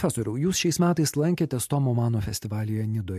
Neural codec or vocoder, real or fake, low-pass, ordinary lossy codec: none; real; 14.4 kHz; MP3, 64 kbps